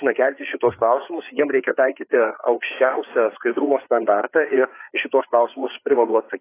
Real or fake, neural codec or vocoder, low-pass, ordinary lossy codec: fake; codec, 16 kHz, 4 kbps, FreqCodec, larger model; 3.6 kHz; AAC, 24 kbps